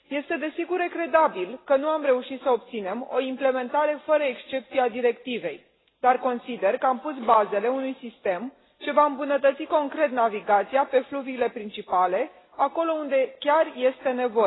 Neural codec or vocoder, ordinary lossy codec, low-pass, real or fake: none; AAC, 16 kbps; 7.2 kHz; real